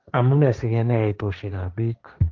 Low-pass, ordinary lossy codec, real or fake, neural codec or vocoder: 7.2 kHz; Opus, 32 kbps; fake; codec, 16 kHz, 1.1 kbps, Voila-Tokenizer